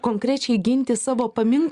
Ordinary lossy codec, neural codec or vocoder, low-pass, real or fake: Opus, 64 kbps; none; 10.8 kHz; real